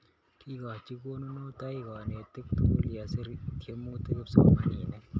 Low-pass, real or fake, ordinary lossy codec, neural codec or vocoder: none; real; none; none